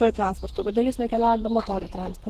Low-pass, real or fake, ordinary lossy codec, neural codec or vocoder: 14.4 kHz; fake; Opus, 16 kbps; codec, 32 kHz, 1.9 kbps, SNAC